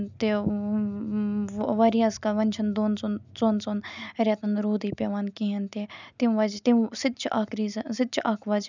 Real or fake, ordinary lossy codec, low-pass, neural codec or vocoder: real; none; 7.2 kHz; none